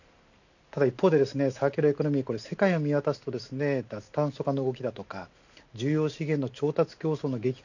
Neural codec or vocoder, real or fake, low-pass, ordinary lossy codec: none; real; 7.2 kHz; none